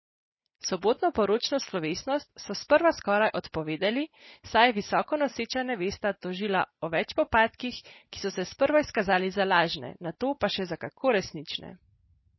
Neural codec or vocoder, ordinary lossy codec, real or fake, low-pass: none; MP3, 24 kbps; real; 7.2 kHz